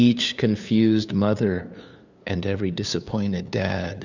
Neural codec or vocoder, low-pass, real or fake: codec, 16 kHz, 2 kbps, FunCodec, trained on LibriTTS, 25 frames a second; 7.2 kHz; fake